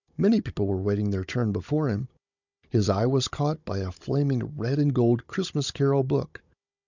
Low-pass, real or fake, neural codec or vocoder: 7.2 kHz; fake; codec, 16 kHz, 16 kbps, FunCodec, trained on Chinese and English, 50 frames a second